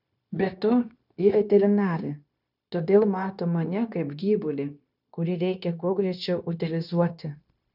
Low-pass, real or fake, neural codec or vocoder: 5.4 kHz; fake; codec, 16 kHz, 0.9 kbps, LongCat-Audio-Codec